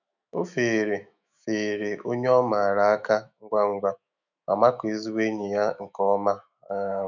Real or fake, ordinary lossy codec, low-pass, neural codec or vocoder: fake; none; 7.2 kHz; autoencoder, 48 kHz, 128 numbers a frame, DAC-VAE, trained on Japanese speech